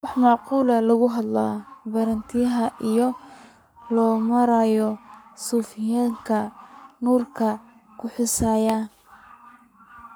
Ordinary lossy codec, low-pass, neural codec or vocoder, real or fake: none; none; codec, 44.1 kHz, 7.8 kbps, Pupu-Codec; fake